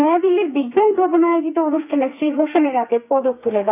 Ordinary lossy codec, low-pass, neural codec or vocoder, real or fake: none; 3.6 kHz; codec, 32 kHz, 1.9 kbps, SNAC; fake